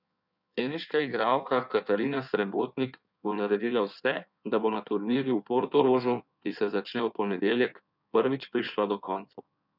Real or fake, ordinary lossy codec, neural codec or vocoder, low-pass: fake; none; codec, 16 kHz in and 24 kHz out, 1.1 kbps, FireRedTTS-2 codec; 5.4 kHz